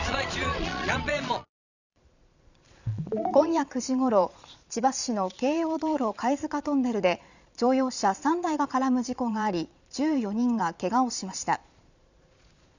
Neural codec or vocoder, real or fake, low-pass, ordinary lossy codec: vocoder, 22.05 kHz, 80 mel bands, Vocos; fake; 7.2 kHz; none